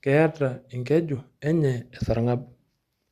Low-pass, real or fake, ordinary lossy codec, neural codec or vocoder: 14.4 kHz; fake; Opus, 64 kbps; vocoder, 44.1 kHz, 128 mel bands every 512 samples, BigVGAN v2